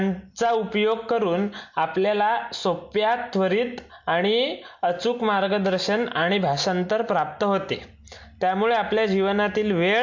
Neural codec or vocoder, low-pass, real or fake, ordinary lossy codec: none; 7.2 kHz; real; MP3, 48 kbps